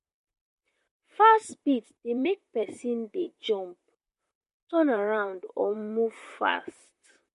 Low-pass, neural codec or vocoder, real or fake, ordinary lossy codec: 14.4 kHz; vocoder, 44.1 kHz, 128 mel bands, Pupu-Vocoder; fake; MP3, 48 kbps